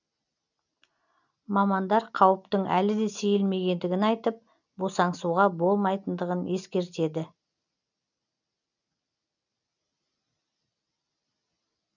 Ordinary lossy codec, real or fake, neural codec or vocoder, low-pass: none; real; none; 7.2 kHz